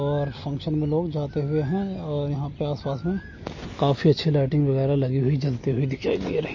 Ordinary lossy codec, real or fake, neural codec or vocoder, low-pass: MP3, 32 kbps; real; none; 7.2 kHz